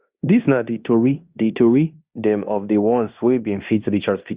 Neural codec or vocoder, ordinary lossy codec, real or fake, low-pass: codec, 16 kHz in and 24 kHz out, 0.9 kbps, LongCat-Audio-Codec, fine tuned four codebook decoder; Opus, 64 kbps; fake; 3.6 kHz